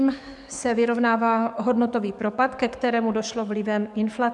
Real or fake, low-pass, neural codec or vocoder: fake; 10.8 kHz; codec, 44.1 kHz, 7.8 kbps, DAC